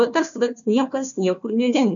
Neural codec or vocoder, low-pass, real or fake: codec, 16 kHz, 1 kbps, FunCodec, trained on Chinese and English, 50 frames a second; 7.2 kHz; fake